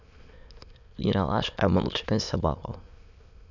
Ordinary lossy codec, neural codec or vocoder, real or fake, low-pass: none; autoencoder, 22.05 kHz, a latent of 192 numbers a frame, VITS, trained on many speakers; fake; 7.2 kHz